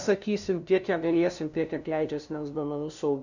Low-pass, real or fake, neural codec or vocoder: 7.2 kHz; fake; codec, 16 kHz, 0.5 kbps, FunCodec, trained on LibriTTS, 25 frames a second